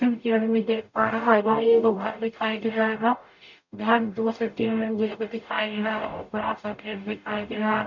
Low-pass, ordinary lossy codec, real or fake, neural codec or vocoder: 7.2 kHz; none; fake; codec, 44.1 kHz, 0.9 kbps, DAC